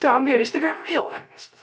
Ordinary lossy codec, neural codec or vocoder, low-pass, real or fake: none; codec, 16 kHz, 0.3 kbps, FocalCodec; none; fake